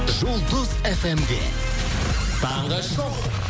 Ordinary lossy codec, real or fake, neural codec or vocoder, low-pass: none; real; none; none